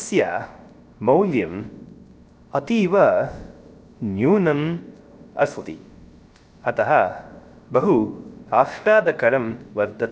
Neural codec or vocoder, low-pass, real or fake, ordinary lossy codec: codec, 16 kHz, 0.3 kbps, FocalCodec; none; fake; none